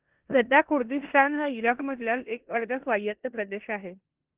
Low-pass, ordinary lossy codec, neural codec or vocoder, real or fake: 3.6 kHz; Opus, 16 kbps; codec, 16 kHz in and 24 kHz out, 0.9 kbps, LongCat-Audio-Codec, four codebook decoder; fake